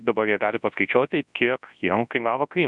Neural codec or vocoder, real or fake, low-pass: codec, 24 kHz, 0.9 kbps, WavTokenizer, large speech release; fake; 10.8 kHz